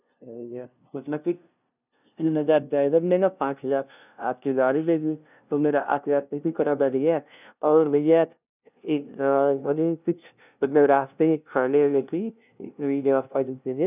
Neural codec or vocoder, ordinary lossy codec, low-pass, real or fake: codec, 16 kHz, 0.5 kbps, FunCodec, trained on LibriTTS, 25 frames a second; none; 3.6 kHz; fake